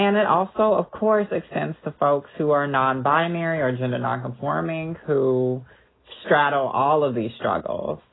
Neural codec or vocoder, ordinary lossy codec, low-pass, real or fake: none; AAC, 16 kbps; 7.2 kHz; real